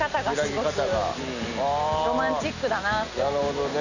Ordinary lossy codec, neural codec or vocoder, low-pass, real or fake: none; none; 7.2 kHz; real